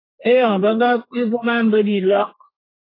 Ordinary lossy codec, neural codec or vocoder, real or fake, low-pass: AAC, 24 kbps; codec, 32 kHz, 1.9 kbps, SNAC; fake; 5.4 kHz